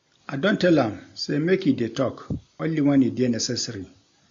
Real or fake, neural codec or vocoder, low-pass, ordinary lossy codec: real; none; 7.2 kHz; MP3, 48 kbps